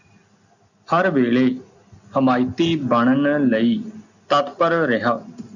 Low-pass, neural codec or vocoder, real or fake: 7.2 kHz; none; real